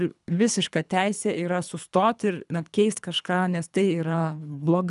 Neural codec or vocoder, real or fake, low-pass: codec, 24 kHz, 3 kbps, HILCodec; fake; 10.8 kHz